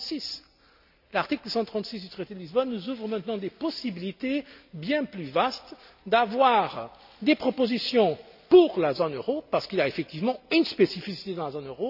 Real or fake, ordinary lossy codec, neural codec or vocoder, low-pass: real; none; none; 5.4 kHz